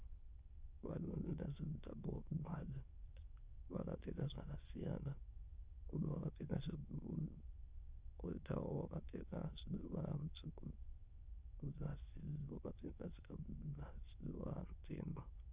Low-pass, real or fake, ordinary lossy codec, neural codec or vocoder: 3.6 kHz; fake; Opus, 24 kbps; autoencoder, 22.05 kHz, a latent of 192 numbers a frame, VITS, trained on many speakers